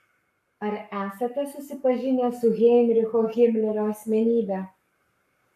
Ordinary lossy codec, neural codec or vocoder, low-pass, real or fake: AAC, 96 kbps; codec, 44.1 kHz, 7.8 kbps, Pupu-Codec; 14.4 kHz; fake